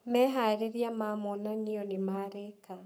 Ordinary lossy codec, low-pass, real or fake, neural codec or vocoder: none; none; fake; codec, 44.1 kHz, 7.8 kbps, Pupu-Codec